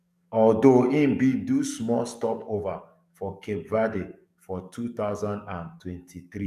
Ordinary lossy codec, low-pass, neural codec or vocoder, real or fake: none; 14.4 kHz; codec, 44.1 kHz, 7.8 kbps, DAC; fake